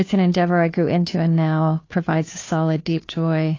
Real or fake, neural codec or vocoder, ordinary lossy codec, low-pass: fake; codec, 16 kHz, 2 kbps, FunCodec, trained on Chinese and English, 25 frames a second; AAC, 32 kbps; 7.2 kHz